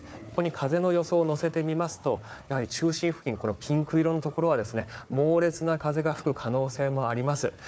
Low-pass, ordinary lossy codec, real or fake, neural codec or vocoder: none; none; fake; codec, 16 kHz, 4 kbps, FunCodec, trained on Chinese and English, 50 frames a second